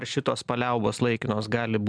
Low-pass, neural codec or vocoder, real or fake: 9.9 kHz; none; real